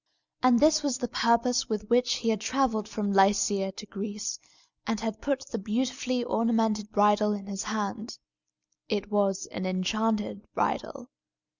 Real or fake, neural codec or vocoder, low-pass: real; none; 7.2 kHz